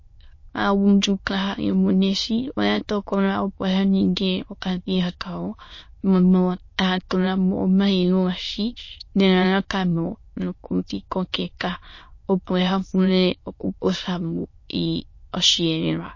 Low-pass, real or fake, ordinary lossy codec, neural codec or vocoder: 7.2 kHz; fake; MP3, 32 kbps; autoencoder, 22.05 kHz, a latent of 192 numbers a frame, VITS, trained on many speakers